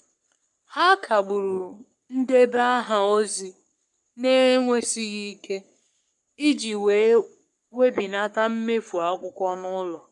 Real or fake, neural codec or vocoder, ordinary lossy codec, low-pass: fake; codec, 44.1 kHz, 3.4 kbps, Pupu-Codec; none; 10.8 kHz